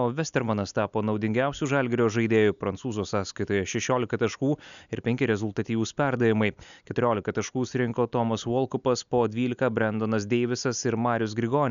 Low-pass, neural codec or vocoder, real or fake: 7.2 kHz; none; real